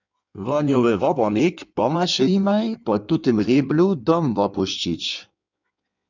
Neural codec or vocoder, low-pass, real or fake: codec, 16 kHz in and 24 kHz out, 1.1 kbps, FireRedTTS-2 codec; 7.2 kHz; fake